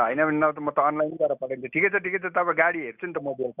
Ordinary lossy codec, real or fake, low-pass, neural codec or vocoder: none; real; 3.6 kHz; none